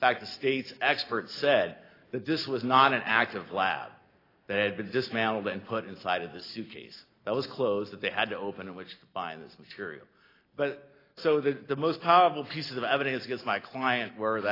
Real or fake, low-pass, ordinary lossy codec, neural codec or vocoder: real; 5.4 kHz; AAC, 24 kbps; none